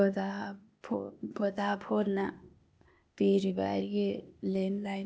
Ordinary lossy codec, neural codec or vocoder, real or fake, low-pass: none; codec, 16 kHz, 0.8 kbps, ZipCodec; fake; none